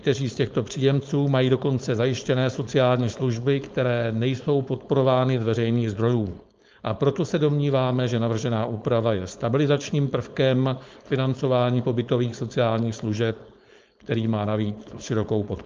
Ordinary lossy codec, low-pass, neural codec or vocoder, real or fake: Opus, 24 kbps; 7.2 kHz; codec, 16 kHz, 4.8 kbps, FACodec; fake